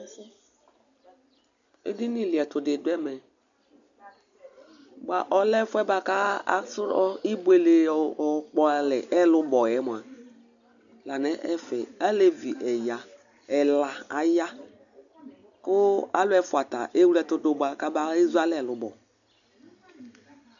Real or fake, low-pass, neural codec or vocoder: real; 7.2 kHz; none